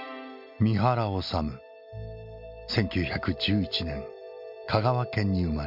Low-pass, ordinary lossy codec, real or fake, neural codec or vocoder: 5.4 kHz; MP3, 48 kbps; real; none